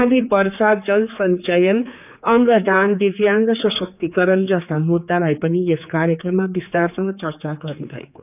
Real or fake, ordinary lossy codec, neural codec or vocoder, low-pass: fake; none; codec, 16 kHz, 4 kbps, X-Codec, HuBERT features, trained on general audio; 3.6 kHz